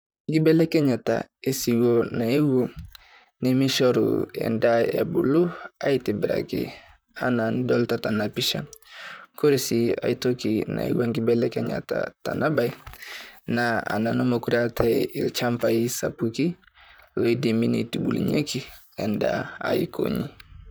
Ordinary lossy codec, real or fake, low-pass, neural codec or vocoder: none; fake; none; vocoder, 44.1 kHz, 128 mel bands, Pupu-Vocoder